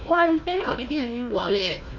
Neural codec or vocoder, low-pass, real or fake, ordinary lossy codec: codec, 16 kHz, 1 kbps, FunCodec, trained on Chinese and English, 50 frames a second; 7.2 kHz; fake; none